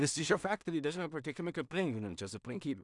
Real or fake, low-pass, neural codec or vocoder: fake; 10.8 kHz; codec, 16 kHz in and 24 kHz out, 0.4 kbps, LongCat-Audio-Codec, two codebook decoder